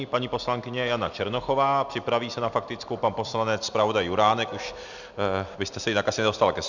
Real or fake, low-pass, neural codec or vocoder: real; 7.2 kHz; none